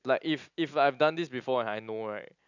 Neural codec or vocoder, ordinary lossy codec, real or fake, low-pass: none; none; real; 7.2 kHz